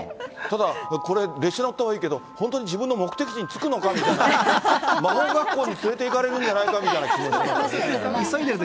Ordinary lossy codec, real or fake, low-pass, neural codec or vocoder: none; real; none; none